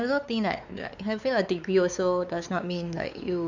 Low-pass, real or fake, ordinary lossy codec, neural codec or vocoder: 7.2 kHz; fake; none; codec, 16 kHz, 4 kbps, X-Codec, WavLM features, trained on Multilingual LibriSpeech